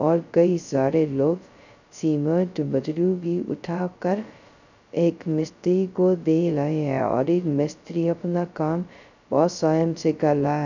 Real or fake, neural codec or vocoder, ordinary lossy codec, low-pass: fake; codec, 16 kHz, 0.2 kbps, FocalCodec; none; 7.2 kHz